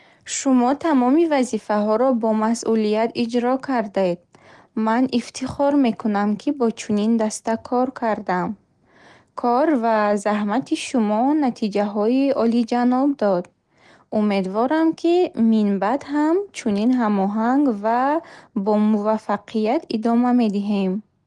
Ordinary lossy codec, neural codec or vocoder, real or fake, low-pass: Opus, 24 kbps; none; real; 10.8 kHz